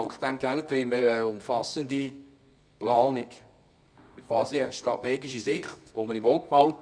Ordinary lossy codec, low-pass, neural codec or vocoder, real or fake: none; 9.9 kHz; codec, 24 kHz, 0.9 kbps, WavTokenizer, medium music audio release; fake